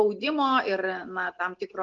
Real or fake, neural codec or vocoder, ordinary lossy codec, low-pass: real; none; Opus, 32 kbps; 7.2 kHz